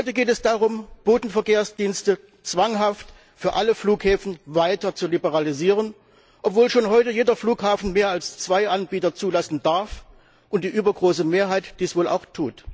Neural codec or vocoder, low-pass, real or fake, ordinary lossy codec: none; none; real; none